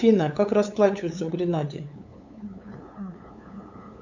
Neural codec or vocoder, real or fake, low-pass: codec, 16 kHz, 8 kbps, FunCodec, trained on LibriTTS, 25 frames a second; fake; 7.2 kHz